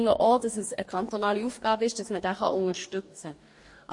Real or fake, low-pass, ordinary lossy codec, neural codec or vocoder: fake; 10.8 kHz; MP3, 48 kbps; codec, 44.1 kHz, 2.6 kbps, DAC